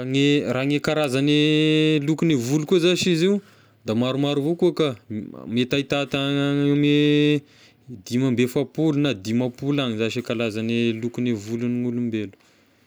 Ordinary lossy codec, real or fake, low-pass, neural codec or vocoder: none; real; none; none